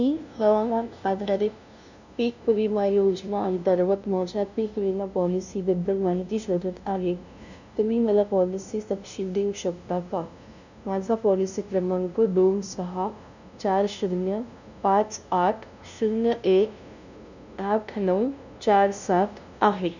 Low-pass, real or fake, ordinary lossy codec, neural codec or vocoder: 7.2 kHz; fake; none; codec, 16 kHz, 0.5 kbps, FunCodec, trained on LibriTTS, 25 frames a second